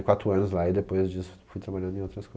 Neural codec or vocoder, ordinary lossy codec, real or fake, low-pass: none; none; real; none